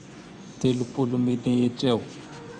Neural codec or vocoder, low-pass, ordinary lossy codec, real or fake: vocoder, 24 kHz, 100 mel bands, Vocos; 9.9 kHz; Opus, 64 kbps; fake